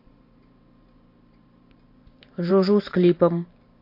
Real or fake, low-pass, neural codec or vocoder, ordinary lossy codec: fake; 5.4 kHz; vocoder, 44.1 kHz, 128 mel bands every 512 samples, BigVGAN v2; MP3, 32 kbps